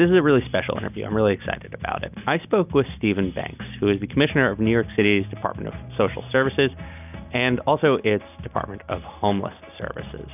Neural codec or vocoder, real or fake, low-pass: none; real; 3.6 kHz